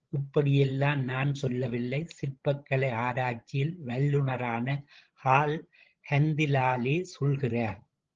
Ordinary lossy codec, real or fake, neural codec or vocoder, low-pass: Opus, 16 kbps; fake; codec, 16 kHz, 16 kbps, FreqCodec, larger model; 7.2 kHz